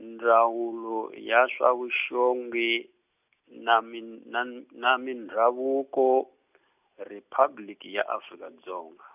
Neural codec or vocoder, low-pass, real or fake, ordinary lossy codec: none; 3.6 kHz; real; none